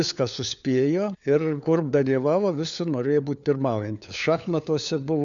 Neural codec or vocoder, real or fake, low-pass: codec, 16 kHz, 4 kbps, FunCodec, trained on LibriTTS, 50 frames a second; fake; 7.2 kHz